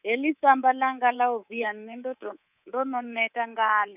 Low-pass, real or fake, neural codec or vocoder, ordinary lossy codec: 3.6 kHz; fake; codec, 24 kHz, 3.1 kbps, DualCodec; none